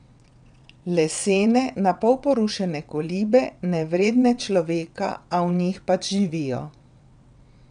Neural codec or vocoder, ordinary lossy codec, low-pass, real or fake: vocoder, 22.05 kHz, 80 mel bands, WaveNeXt; none; 9.9 kHz; fake